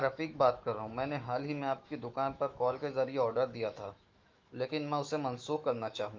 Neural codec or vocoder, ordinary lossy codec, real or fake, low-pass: autoencoder, 48 kHz, 128 numbers a frame, DAC-VAE, trained on Japanese speech; Opus, 24 kbps; fake; 7.2 kHz